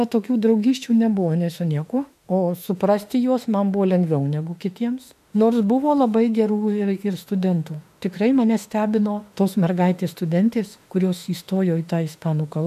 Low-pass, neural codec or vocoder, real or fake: 14.4 kHz; autoencoder, 48 kHz, 32 numbers a frame, DAC-VAE, trained on Japanese speech; fake